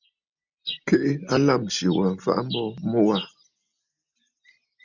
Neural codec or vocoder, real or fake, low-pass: none; real; 7.2 kHz